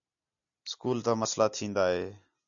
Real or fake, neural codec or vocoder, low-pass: real; none; 7.2 kHz